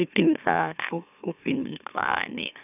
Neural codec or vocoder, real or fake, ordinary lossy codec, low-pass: autoencoder, 44.1 kHz, a latent of 192 numbers a frame, MeloTTS; fake; none; 3.6 kHz